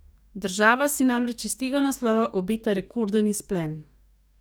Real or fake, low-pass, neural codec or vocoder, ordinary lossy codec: fake; none; codec, 44.1 kHz, 2.6 kbps, DAC; none